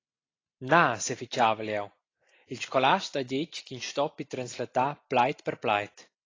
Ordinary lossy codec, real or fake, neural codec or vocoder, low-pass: AAC, 32 kbps; real; none; 7.2 kHz